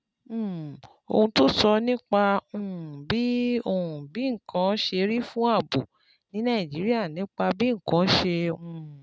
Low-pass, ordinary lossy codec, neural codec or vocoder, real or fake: none; none; none; real